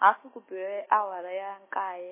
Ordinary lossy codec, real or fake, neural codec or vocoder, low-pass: MP3, 16 kbps; real; none; 3.6 kHz